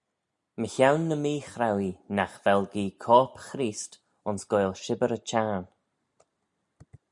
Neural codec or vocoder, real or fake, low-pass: none; real; 10.8 kHz